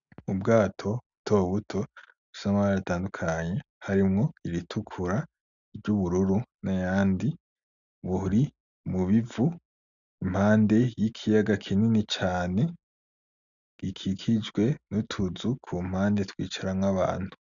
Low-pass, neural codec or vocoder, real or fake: 7.2 kHz; none; real